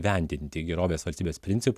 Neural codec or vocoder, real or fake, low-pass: none; real; 14.4 kHz